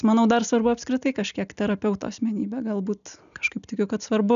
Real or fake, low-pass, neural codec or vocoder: real; 7.2 kHz; none